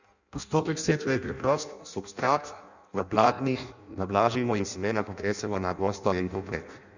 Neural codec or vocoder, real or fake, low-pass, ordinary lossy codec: codec, 16 kHz in and 24 kHz out, 0.6 kbps, FireRedTTS-2 codec; fake; 7.2 kHz; none